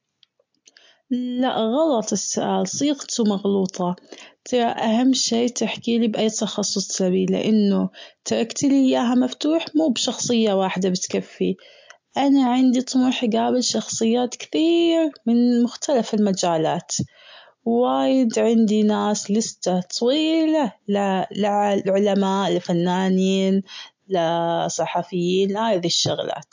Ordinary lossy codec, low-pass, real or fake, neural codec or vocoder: MP3, 48 kbps; 7.2 kHz; real; none